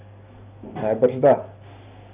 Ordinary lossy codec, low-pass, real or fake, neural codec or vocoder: Opus, 64 kbps; 3.6 kHz; real; none